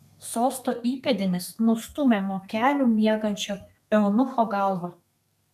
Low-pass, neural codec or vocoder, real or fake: 14.4 kHz; codec, 32 kHz, 1.9 kbps, SNAC; fake